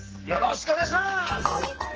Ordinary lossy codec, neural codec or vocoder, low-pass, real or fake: Opus, 16 kbps; codec, 44.1 kHz, 2.6 kbps, SNAC; 7.2 kHz; fake